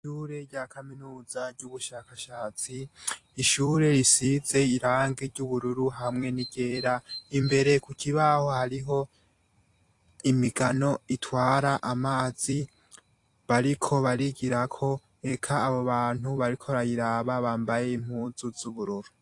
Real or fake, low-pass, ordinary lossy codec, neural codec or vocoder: fake; 10.8 kHz; AAC, 48 kbps; vocoder, 44.1 kHz, 128 mel bands every 256 samples, BigVGAN v2